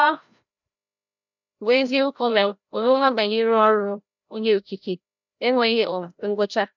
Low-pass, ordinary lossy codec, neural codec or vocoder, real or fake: 7.2 kHz; none; codec, 16 kHz, 0.5 kbps, FreqCodec, larger model; fake